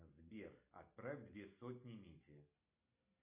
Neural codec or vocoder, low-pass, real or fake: none; 3.6 kHz; real